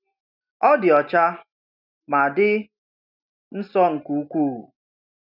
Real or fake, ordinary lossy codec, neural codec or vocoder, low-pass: real; none; none; 5.4 kHz